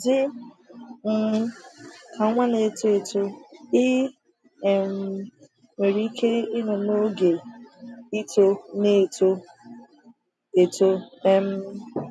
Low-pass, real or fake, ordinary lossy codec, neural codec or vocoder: none; real; none; none